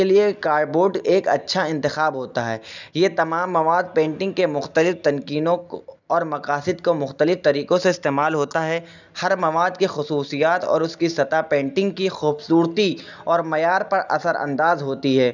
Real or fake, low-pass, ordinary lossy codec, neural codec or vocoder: real; 7.2 kHz; none; none